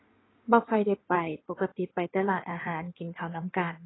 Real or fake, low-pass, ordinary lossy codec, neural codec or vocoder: fake; 7.2 kHz; AAC, 16 kbps; codec, 16 kHz in and 24 kHz out, 2.2 kbps, FireRedTTS-2 codec